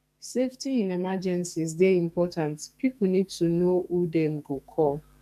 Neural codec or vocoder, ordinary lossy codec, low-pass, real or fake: codec, 44.1 kHz, 2.6 kbps, SNAC; none; 14.4 kHz; fake